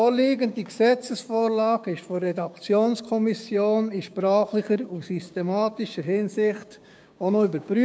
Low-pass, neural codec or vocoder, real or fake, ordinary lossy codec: none; codec, 16 kHz, 6 kbps, DAC; fake; none